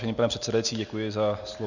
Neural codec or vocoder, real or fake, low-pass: none; real; 7.2 kHz